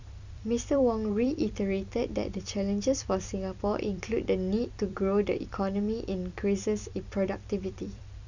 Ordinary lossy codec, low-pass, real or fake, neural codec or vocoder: none; 7.2 kHz; fake; vocoder, 44.1 kHz, 128 mel bands every 256 samples, BigVGAN v2